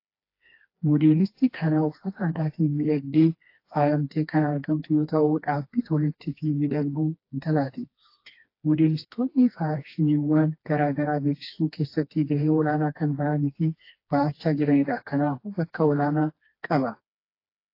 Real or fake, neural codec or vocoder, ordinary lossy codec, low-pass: fake; codec, 16 kHz, 2 kbps, FreqCodec, smaller model; AAC, 32 kbps; 5.4 kHz